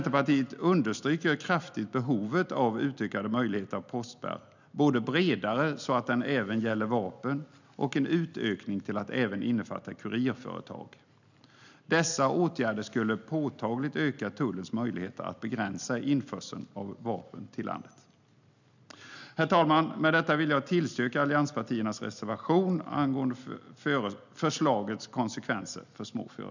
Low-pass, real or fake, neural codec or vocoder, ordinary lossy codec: 7.2 kHz; real; none; none